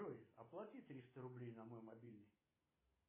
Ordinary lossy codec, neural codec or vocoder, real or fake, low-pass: MP3, 16 kbps; none; real; 3.6 kHz